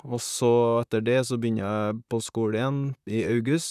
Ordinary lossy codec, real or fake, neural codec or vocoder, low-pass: none; fake; vocoder, 44.1 kHz, 128 mel bands, Pupu-Vocoder; 14.4 kHz